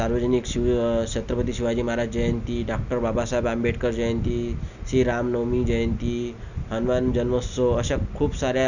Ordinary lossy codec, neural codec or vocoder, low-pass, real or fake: none; none; 7.2 kHz; real